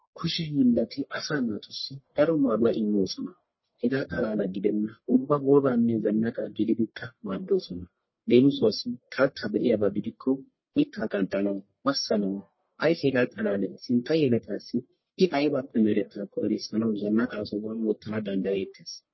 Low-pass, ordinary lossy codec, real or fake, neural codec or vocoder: 7.2 kHz; MP3, 24 kbps; fake; codec, 44.1 kHz, 1.7 kbps, Pupu-Codec